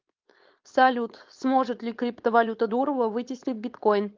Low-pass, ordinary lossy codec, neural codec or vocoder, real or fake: 7.2 kHz; Opus, 24 kbps; codec, 16 kHz, 4.8 kbps, FACodec; fake